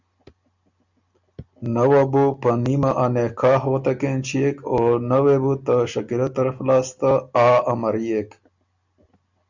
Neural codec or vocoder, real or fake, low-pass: none; real; 7.2 kHz